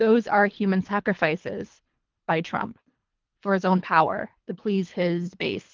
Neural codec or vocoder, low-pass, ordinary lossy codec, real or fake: codec, 24 kHz, 3 kbps, HILCodec; 7.2 kHz; Opus, 32 kbps; fake